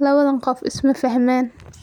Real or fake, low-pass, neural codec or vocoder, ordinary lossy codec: real; 19.8 kHz; none; none